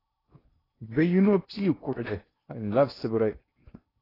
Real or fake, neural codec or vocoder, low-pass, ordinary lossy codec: fake; codec, 16 kHz in and 24 kHz out, 0.8 kbps, FocalCodec, streaming, 65536 codes; 5.4 kHz; AAC, 24 kbps